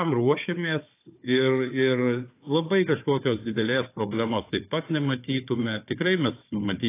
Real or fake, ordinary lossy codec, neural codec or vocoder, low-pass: fake; AAC, 24 kbps; codec, 16 kHz, 4 kbps, FunCodec, trained on Chinese and English, 50 frames a second; 3.6 kHz